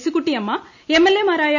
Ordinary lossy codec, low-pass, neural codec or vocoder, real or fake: none; 7.2 kHz; none; real